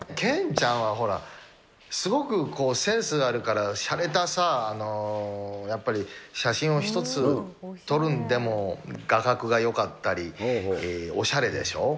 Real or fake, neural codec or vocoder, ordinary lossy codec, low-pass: real; none; none; none